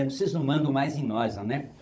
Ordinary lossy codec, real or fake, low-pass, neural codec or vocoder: none; fake; none; codec, 16 kHz, 16 kbps, FunCodec, trained on Chinese and English, 50 frames a second